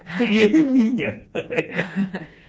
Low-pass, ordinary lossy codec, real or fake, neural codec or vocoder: none; none; fake; codec, 16 kHz, 2 kbps, FreqCodec, smaller model